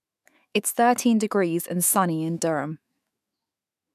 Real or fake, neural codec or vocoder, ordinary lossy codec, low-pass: fake; autoencoder, 48 kHz, 128 numbers a frame, DAC-VAE, trained on Japanese speech; AAC, 96 kbps; 14.4 kHz